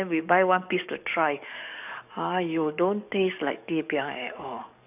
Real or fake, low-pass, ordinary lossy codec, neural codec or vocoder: fake; 3.6 kHz; none; codec, 44.1 kHz, 7.8 kbps, DAC